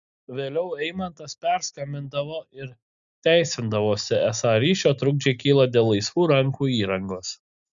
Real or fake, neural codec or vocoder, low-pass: real; none; 7.2 kHz